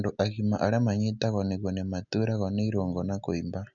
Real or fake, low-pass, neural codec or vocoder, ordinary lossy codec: real; 7.2 kHz; none; none